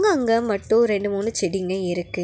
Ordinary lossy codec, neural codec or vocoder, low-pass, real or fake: none; none; none; real